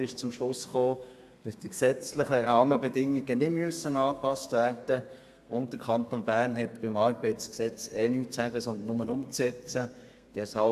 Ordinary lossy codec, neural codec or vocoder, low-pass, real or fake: AAC, 96 kbps; codec, 32 kHz, 1.9 kbps, SNAC; 14.4 kHz; fake